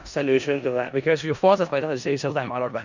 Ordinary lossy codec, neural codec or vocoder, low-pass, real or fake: none; codec, 16 kHz in and 24 kHz out, 0.4 kbps, LongCat-Audio-Codec, four codebook decoder; 7.2 kHz; fake